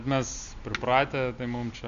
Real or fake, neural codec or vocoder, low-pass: real; none; 7.2 kHz